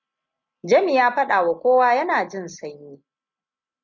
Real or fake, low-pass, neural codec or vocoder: real; 7.2 kHz; none